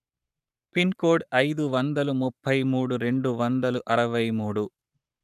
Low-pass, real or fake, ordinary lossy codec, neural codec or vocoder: 14.4 kHz; fake; none; codec, 44.1 kHz, 7.8 kbps, Pupu-Codec